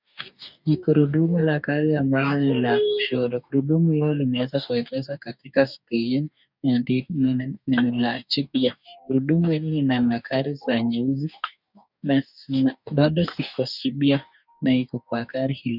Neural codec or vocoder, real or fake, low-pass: codec, 44.1 kHz, 2.6 kbps, DAC; fake; 5.4 kHz